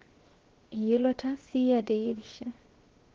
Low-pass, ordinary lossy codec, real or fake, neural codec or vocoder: 7.2 kHz; Opus, 16 kbps; fake; codec, 16 kHz, 0.7 kbps, FocalCodec